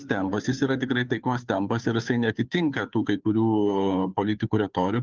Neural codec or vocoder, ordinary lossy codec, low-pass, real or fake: codec, 16 kHz, 2 kbps, FunCodec, trained on Chinese and English, 25 frames a second; Opus, 32 kbps; 7.2 kHz; fake